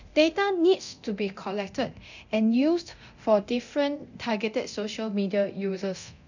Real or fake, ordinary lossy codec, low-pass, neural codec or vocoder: fake; none; 7.2 kHz; codec, 24 kHz, 0.9 kbps, DualCodec